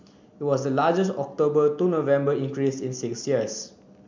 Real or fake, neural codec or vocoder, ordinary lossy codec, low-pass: real; none; MP3, 64 kbps; 7.2 kHz